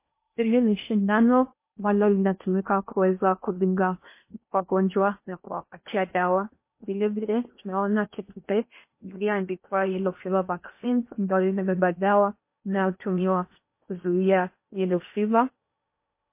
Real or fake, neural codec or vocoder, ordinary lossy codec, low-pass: fake; codec, 16 kHz in and 24 kHz out, 0.8 kbps, FocalCodec, streaming, 65536 codes; MP3, 24 kbps; 3.6 kHz